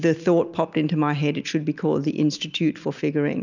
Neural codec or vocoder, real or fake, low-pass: none; real; 7.2 kHz